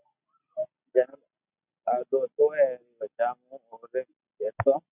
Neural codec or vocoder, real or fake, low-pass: none; real; 3.6 kHz